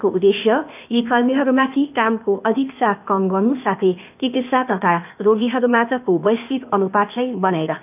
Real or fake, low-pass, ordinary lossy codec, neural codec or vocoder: fake; 3.6 kHz; none; codec, 16 kHz, 0.8 kbps, ZipCodec